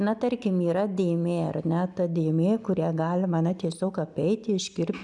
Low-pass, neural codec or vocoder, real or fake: 10.8 kHz; none; real